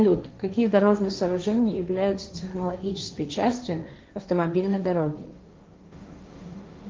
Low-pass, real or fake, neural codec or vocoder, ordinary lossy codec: 7.2 kHz; fake; codec, 16 kHz, 1.1 kbps, Voila-Tokenizer; Opus, 32 kbps